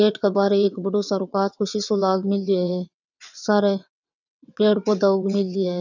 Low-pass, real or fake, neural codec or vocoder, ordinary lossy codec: 7.2 kHz; fake; vocoder, 22.05 kHz, 80 mel bands, WaveNeXt; none